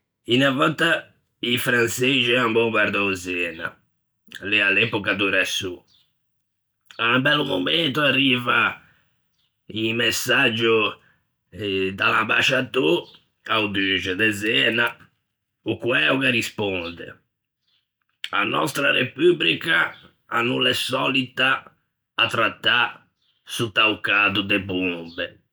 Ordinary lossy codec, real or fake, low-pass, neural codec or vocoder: none; real; none; none